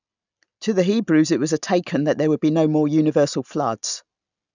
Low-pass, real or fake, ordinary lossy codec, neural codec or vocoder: 7.2 kHz; real; none; none